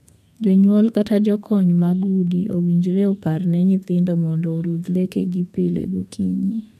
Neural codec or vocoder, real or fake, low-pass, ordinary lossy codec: codec, 32 kHz, 1.9 kbps, SNAC; fake; 14.4 kHz; MP3, 96 kbps